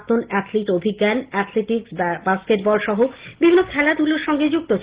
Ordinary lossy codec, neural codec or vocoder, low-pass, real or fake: Opus, 16 kbps; none; 3.6 kHz; real